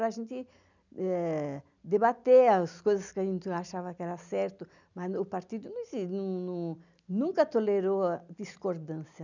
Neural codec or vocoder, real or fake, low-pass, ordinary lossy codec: none; real; 7.2 kHz; none